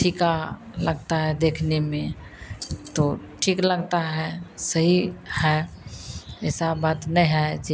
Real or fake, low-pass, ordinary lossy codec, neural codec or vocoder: real; none; none; none